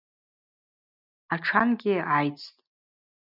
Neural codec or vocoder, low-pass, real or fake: none; 5.4 kHz; real